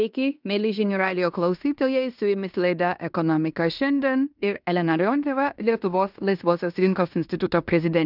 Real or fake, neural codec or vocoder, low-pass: fake; codec, 16 kHz in and 24 kHz out, 0.9 kbps, LongCat-Audio-Codec, fine tuned four codebook decoder; 5.4 kHz